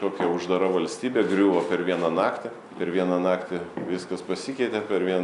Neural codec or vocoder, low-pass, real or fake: none; 10.8 kHz; real